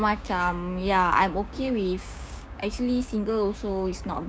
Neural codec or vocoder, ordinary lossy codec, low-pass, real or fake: codec, 16 kHz, 6 kbps, DAC; none; none; fake